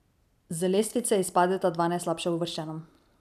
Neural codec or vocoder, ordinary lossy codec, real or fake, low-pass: none; none; real; 14.4 kHz